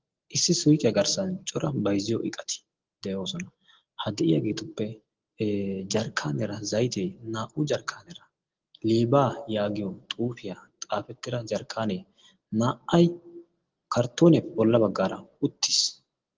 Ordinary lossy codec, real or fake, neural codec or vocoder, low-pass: Opus, 16 kbps; real; none; 7.2 kHz